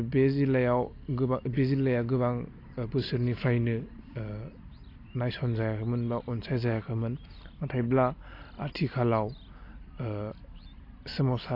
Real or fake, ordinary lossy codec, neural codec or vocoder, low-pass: real; AAC, 32 kbps; none; 5.4 kHz